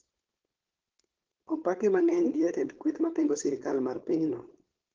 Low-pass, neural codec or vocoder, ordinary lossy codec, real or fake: 7.2 kHz; codec, 16 kHz, 4.8 kbps, FACodec; Opus, 16 kbps; fake